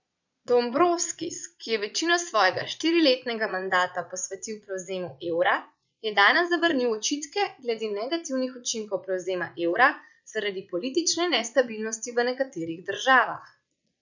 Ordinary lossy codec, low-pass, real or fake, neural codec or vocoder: none; 7.2 kHz; fake; vocoder, 22.05 kHz, 80 mel bands, Vocos